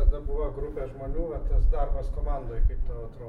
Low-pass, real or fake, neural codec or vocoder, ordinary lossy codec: 19.8 kHz; real; none; MP3, 64 kbps